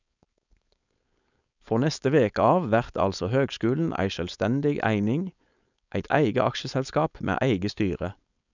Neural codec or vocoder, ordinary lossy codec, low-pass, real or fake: codec, 16 kHz, 4.8 kbps, FACodec; none; 7.2 kHz; fake